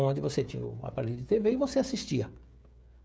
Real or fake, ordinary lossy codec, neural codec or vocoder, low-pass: fake; none; codec, 16 kHz, 16 kbps, FreqCodec, smaller model; none